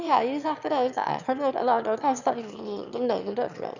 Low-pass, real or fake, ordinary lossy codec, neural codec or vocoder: 7.2 kHz; fake; none; autoencoder, 22.05 kHz, a latent of 192 numbers a frame, VITS, trained on one speaker